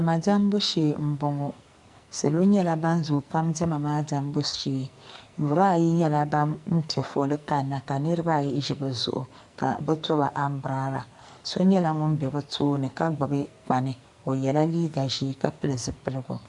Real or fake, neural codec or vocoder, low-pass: fake; codec, 44.1 kHz, 2.6 kbps, SNAC; 10.8 kHz